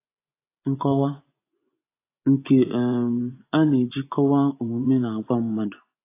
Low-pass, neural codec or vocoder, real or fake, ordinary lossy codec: 3.6 kHz; vocoder, 24 kHz, 100 mel bands, Vocos; fake; AAC, 24 kbps